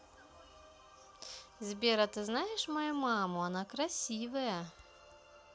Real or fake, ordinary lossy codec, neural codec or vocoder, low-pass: real; none; none; none